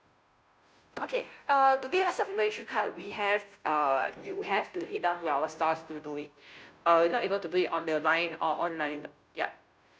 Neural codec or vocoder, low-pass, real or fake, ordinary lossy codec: codec, 16 kHz, 0.5 kbps, FunCodec, trained on Chinese and English, 25 frames a second; none; fake; none